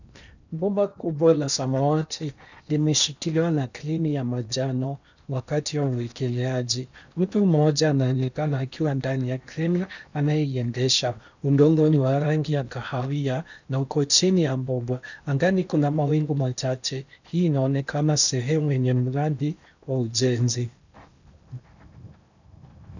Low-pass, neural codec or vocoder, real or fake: 7.2 kHz; codec, 16 kHz in and 24 kHz out, 0.8 kbps, FocalCodec, streaming, 65536 codes; fake